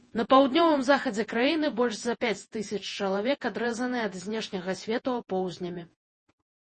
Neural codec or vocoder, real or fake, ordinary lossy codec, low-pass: vocoder, 48 kHz, 128 mel bands, Vocos; fake; MP3, 32 kbps; 10.8 kHz